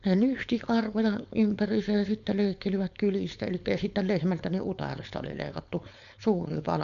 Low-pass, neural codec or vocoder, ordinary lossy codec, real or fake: 7.2 kHz; codec, 16 kHz, 4.8 kbps, FACodec; none; fake